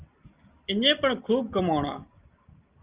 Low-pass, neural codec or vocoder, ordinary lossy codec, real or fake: 3.6 kHz; none; Opus, 32 kbps; real